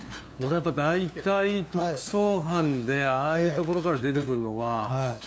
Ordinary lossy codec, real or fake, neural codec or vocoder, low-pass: none; fake; codec, 16 kHz, 2 kbps, FunCodec, trained on LibriTTS, 25 frames a second; none